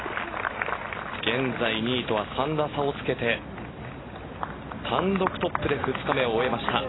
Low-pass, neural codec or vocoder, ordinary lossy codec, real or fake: 7.2 kHz; none; AAC, 16 kbps; real